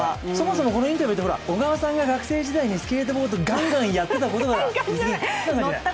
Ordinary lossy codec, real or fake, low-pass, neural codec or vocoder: none; real; none; none